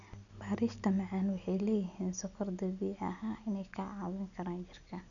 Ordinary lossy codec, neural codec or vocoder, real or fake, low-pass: none; none; real; 7.2 kHz